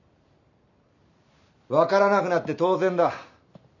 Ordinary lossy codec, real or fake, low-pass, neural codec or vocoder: none; real; 7.2 kHz; none